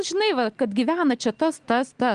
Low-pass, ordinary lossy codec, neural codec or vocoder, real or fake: 9.9 kHz; Opus, 24 kbps; none; real